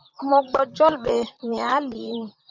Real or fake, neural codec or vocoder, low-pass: fake; vocoder, 44.1 kHz, 128 mel bands, Pupu-Vocoder; 7.2 kHz